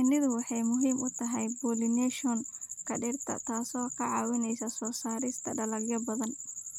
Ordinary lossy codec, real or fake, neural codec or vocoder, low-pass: none; real; none; 19.8 kHz